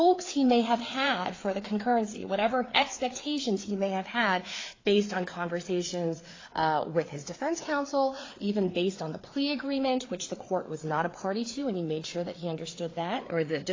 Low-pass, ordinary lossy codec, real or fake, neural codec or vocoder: 7.2 kHz; AAC, 32 kbps; fake; codec, 16 kHz, 4 kbps, FreqCodec, larger model